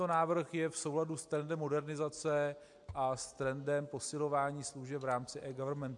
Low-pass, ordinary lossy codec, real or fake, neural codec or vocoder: 10.8 kHz; MP3, 64 kbps; real; none